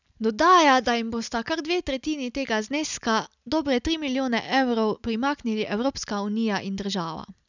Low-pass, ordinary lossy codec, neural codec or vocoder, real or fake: 7.2 kHz; none; none; real